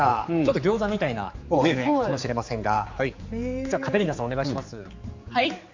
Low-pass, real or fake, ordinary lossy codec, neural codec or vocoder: 7.2 kHz; fake; MP3, 48 kbps; codec, 16 kHz, 4 kbps, X-Codec, HuBERT features, trained on general audio